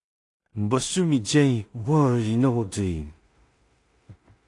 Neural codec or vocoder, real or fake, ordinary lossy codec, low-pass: codec, 16 kHz in and 24 kHz out, 0.4 kbps, LongCat-Audio-Codec, two codebook decoder; fake; AAC, 48 kbps; 10.8 kHz